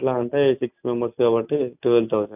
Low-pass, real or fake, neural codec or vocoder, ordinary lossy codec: 3.6 kHz; real; none; none